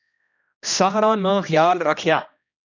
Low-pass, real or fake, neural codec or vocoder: 7.2 kHz; fake; codec, 16 kHz, 1 kbps, X-Codec, HuBERT features, trained on general audio